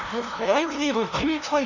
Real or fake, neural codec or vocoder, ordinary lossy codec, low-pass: fake; codec, 16 kHz, 0.5 kbps, FunCodec, trained on LibriTTS, 25 frames a second; none; 7.2 kHz